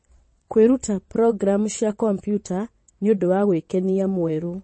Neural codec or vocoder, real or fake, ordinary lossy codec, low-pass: vocoder, 22.05 kHz, 80 mel bands, WaveNeXt; fake; MP3, 32 kbps; 9.9 kHz